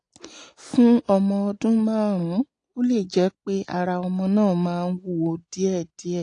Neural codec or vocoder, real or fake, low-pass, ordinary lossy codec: none; real; 9.9 kHz; AAC, 48 kbps